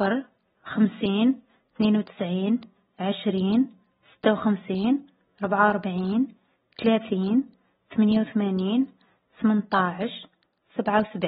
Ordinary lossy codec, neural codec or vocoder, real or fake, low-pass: AAC, 16 kbps; none; real; 19.8 kHz